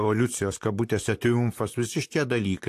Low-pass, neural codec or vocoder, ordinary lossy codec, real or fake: 14.4 kHz; vocoder, 44.1 kHz, 128 mel bands, Pupu-Vocoder; AAC, 64 kbps; fake